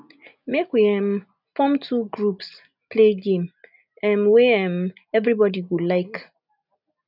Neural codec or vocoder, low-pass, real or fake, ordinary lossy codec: none; 5.4 kHz; real; none